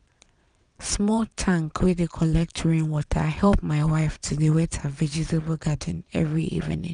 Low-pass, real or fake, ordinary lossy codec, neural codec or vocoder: 9.9 kHz; fake; none; vocoder, 22.05 kHz, 80 mel bands, Vocos